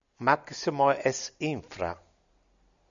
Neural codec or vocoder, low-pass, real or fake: none; 7.2 kHz; real